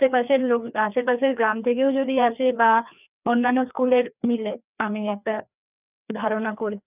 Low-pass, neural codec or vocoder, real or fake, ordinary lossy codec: 3.6 kHz; codec, 16 kHz, 2 kbps, FreqCodec, larger model; fake; none